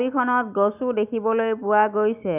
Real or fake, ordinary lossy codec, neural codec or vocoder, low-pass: real; none; none; 3.6 kHz